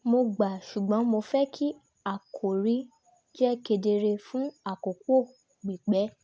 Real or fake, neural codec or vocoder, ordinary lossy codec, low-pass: real; none; none; none